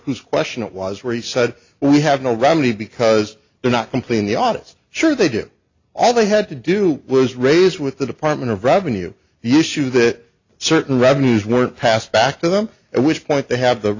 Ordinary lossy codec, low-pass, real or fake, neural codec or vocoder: AAC, 48 kbps; 7.2 kHz; real; none